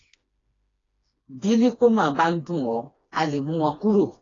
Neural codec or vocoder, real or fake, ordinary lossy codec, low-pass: codec, 16 kHz, 2 kbps, FreqCodec, smaller model; fake; AAC, 32 kbps; 7.2 kHz